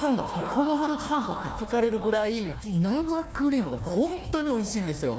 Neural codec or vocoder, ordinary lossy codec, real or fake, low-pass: codec, 16 kHz, 1 kbps, FunCodec, trained on Chinese and English, 50 frames a second; none; fake; none